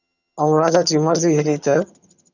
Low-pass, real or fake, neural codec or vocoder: 7.2 kHz; fake; vocoder, 22.05 kHz, 80 mel bands, HiFi-GAN